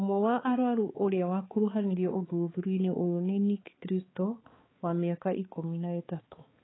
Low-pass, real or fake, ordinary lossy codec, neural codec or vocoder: 7.2 kHz; fake; AAC, 16 kbps; codec, 16 kHz, 4 kbps, X-Codec, HuBERT features, trained on balanced general audio